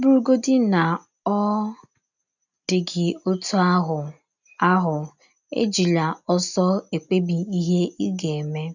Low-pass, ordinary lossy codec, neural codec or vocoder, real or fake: 7.2 kHz; none; none; real